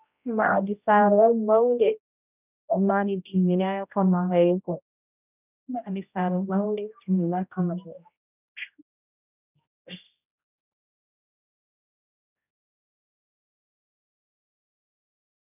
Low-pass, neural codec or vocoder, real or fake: 3.6 kHz; codec, 16 kHz, 0.5 kbps, X-Codec, HuBERT features, trained on general audio; fake